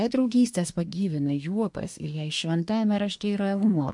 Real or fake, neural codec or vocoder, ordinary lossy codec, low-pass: fake; codec, 24 kHz, 1 kbps, SNAC; MP3, 64 kbps; 10.8 kHz